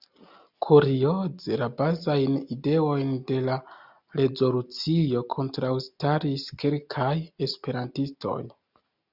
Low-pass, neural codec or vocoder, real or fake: 5.4 kHz; none; real